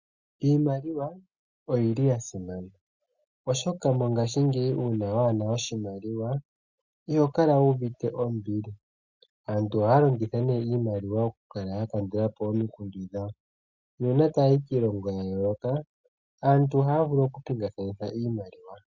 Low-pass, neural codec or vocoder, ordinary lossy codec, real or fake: 7.2 kHz; none; Opus, 64 kbps; real